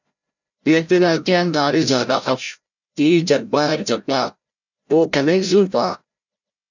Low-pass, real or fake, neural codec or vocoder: 7.2 kHz; fake; codec, 16 kHz, 0.5 kbps, FreqCodec, larger model